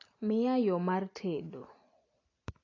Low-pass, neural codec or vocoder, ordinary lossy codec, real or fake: 7.2 kHz; none; AAC, 32 kbps; real